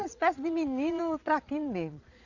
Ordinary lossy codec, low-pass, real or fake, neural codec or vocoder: none; 7.2 kHz; fake; vocoder, 22.05 kHz, 80 mel bands, Vocos